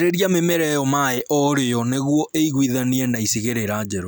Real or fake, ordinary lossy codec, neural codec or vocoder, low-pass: real; none; none; none